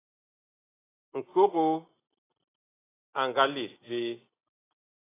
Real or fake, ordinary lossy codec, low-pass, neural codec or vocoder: real; AAC, 16 kbps; 3.6 kHz; none